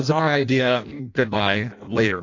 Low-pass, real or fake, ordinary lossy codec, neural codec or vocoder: 7.2 kHz; fake; AAC, 48 kbps; codec, 16 kHz in and 24 kHz out, 0.6 kbps, FireRedTTS-2 codec